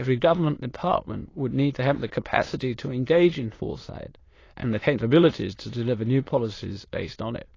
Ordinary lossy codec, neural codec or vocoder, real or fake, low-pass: AAC, 32 kbps; autoencoder, 22.05 kHz, a latent of 192 numbers a frame, VITS, trained on many speakers; fake; 7.2 kHz